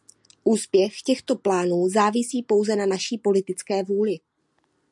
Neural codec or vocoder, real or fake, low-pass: none; real; 10.8 kHz